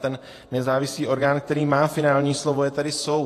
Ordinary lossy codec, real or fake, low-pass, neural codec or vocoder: AAC, 48 kbps; fake; 14.4 kHz; vocoder, 48 kHz, 128 mel bands, Vocos